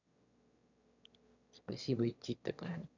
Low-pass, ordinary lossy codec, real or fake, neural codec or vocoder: 7.2 kHz; none; fake; autoencoder, 22.05 kHz, a latent of 192 numbers a frame, VITS, trained on one speaker